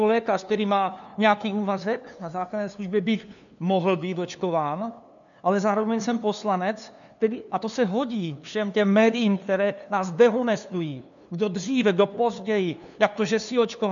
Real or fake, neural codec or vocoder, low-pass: fake; codec, 16 kHz, 2 kbps, FunCodec, trained on LibriTTS, 25 frames a second; 7.2 kHz